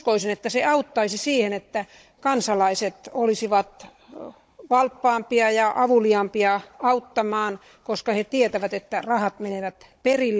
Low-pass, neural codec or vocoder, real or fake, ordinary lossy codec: none; codec, 16 kHz, 16 kbps, FunCodec, trained on Chinese and English, 50 frames a second; fake; none